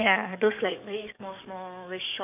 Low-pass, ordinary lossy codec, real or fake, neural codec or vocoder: 3.6 kHz; none; fake; codec, 44.1 kHz, 7.8 kbps, DAC